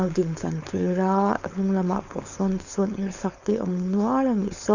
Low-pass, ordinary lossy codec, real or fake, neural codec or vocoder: 7.2 kHz; none; fake; codec, 16 kHz, 4.8 kbps, FACodec